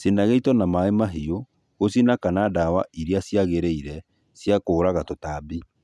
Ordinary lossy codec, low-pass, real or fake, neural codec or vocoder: none; none; real; none